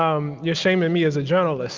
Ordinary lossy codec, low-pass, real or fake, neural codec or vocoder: Opus, 32 kbps; 7.2 kHz; real; none